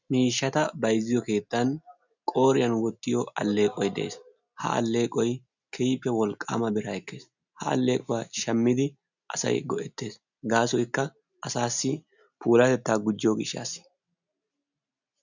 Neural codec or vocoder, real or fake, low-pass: none; real; 7.2 kHz